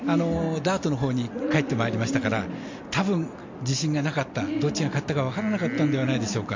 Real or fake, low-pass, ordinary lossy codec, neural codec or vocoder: real; 7.2 kHz; MP3, 48 kbps; none